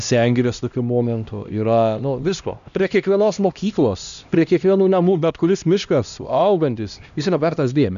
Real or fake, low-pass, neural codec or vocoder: fake; 7.2 kHz; codec, 16 kHz, 1 kbps, X-Codec, HuBERT features, trained on LibriSpeech